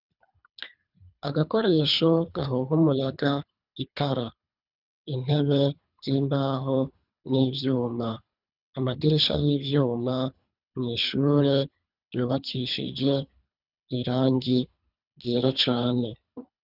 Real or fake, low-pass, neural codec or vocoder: fake; 5.4 kHz; codec, 24 kHz, 3 kbps, HILCodec